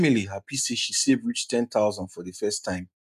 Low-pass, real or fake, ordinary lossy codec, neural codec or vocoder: 14.4 kHz; real; none; none